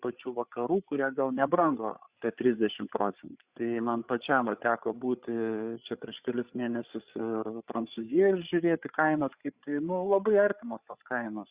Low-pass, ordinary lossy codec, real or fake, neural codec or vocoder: 3.6 kHz; Opus, 64 kbps; fake; codec, 16 kHz, 8 kbps, FreqCodec, larger model